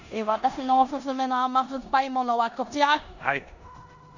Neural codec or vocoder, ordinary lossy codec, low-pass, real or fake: codec, 16 kHz in and 24 kHz out, 0.9 kbps, LongCat-Audio-Codec, four codebook decoder; none; 7.2 kHz; fake